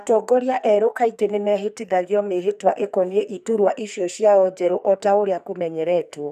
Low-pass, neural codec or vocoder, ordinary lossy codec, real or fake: 14.4 kHz; codec, 44.1 kHz, 2.6 kbps, SNAC; none; fake